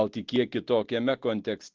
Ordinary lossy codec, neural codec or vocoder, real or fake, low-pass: Opus, 16 kbps; none; real; 7.2 kHz